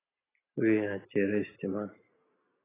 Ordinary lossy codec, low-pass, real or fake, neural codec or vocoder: AAC, 16 kbps; 3.6 kHz; real; none